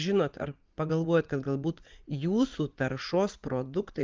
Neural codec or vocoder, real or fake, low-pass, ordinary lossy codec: vocoder, 44.1 kHz, 80 mel bands, Vocos; fake; 7.2 kHz; Opus, 32 kbps